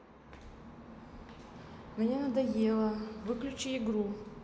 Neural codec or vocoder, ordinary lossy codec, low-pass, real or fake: none; none; none; real